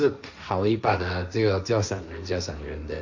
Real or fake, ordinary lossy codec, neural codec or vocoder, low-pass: fake; none; codec, 16 kHz, 1.1 kbps, Voila-Tokenizer; 7.2 kHz